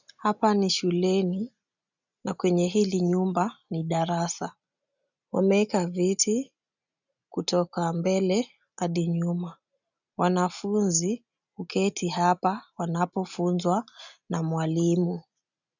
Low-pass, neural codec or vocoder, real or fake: 7.2 kHz; none; real